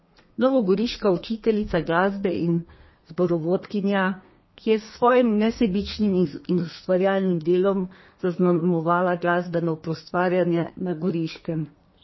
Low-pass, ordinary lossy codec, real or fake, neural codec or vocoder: 7.2 kHz; MP3, 24 kbps; fake; codec, 32 kHz, 1.9 kbps, SNAC